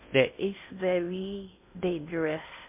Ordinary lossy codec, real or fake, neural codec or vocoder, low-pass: MP3, 24 kbps; fake; codec, 16 kHz in and 24 kHz out, 0.6 kbps, FocalCodec, streaming, 2048 codes; 3.6 kHz